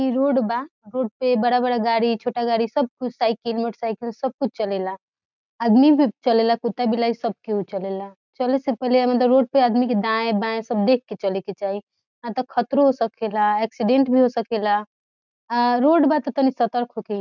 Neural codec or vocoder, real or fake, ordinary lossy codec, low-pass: none; real; none; 7.2 kHz